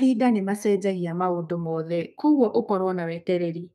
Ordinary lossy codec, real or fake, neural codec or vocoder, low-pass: none; fake; codec, 32 kHz, 1.9 kbps, SNAC; 14.4 kHz